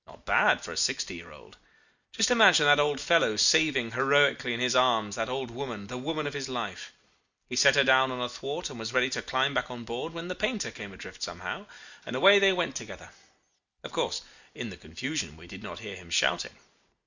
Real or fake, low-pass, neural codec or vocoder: real; 7.2 kHz; none